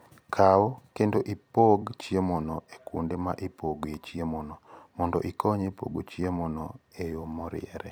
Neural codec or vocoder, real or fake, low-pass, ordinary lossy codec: vocoder, 44.1 kHz, 128 mel bands every 256 samples, BigVGAN v2; fake; none; none